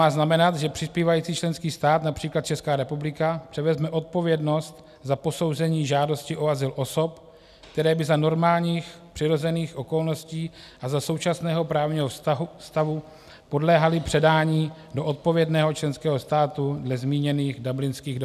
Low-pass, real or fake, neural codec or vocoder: 14.4 kHz; real; none